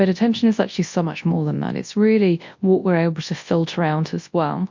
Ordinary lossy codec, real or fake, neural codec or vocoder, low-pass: MP3, 48 kbps; fake; codec, 24 kHz, 0.9 kbps, WavTokenizer, large speech release; 7.2 kHz